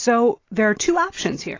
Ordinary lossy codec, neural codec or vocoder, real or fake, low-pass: AAC, 32 kbps; none; real; 7.2 kHz